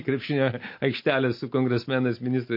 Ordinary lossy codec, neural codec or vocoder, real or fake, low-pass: MP3, 32 kbps; none; real; 5.4 kHz